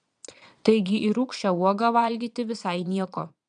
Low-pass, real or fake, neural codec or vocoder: 9.9 kHz; fake; vocoder, 22.05 kHz, 80 mel bands, Vocos